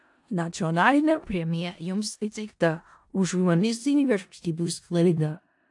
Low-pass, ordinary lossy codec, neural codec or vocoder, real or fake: 10.8 kHz; AAC, 64 kbps; codec, 16 kHz in and 24 kHz out, 0.4 kbps, LongCat-Audio-Codec, four codebook decoder; fake